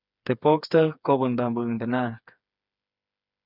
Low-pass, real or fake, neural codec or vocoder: 5.4 kHz; fake; codec, 16 kHz, 4 kbps, FreqCodec, smaller model